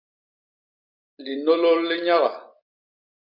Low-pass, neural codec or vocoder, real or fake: 5.4 kHz; none; real